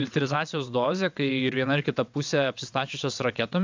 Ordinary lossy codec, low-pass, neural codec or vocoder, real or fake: MP3, 64 kbps; 7.2 kHz; vocoder, 22.05 kHz, 80 mel bands, WaveNeXt; fake